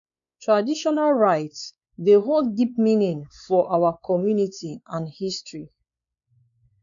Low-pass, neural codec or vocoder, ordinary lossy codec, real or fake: 7.2 kHz; codec, 16 kHz, 4 kbps, X-Codec, WavLM features, trained on Multilingual LibriSpeech; none; fake